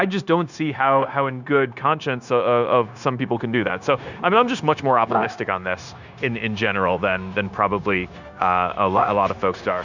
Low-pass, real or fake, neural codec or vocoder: 7.2 kHz; fake; codec, 16 kHz, 0.9 kbps, LongCat-Audio-Codec